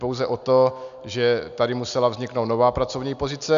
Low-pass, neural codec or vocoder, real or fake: 7.2 kHz; none; real